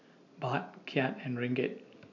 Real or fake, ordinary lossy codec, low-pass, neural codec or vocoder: real; AAC, 48 kbps; 7.2 kHz; none